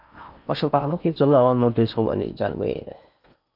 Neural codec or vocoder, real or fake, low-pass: codec, 16 kHz in and 24 kHz out, 0.6 kbps, FocalCodec, streaming, 4096 codes; fake; 5.4 kHz